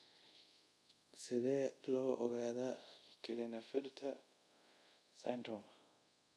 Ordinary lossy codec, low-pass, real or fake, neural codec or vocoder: none; none; fake; codec, 24 kHz, 0.5 kbps, DualCodec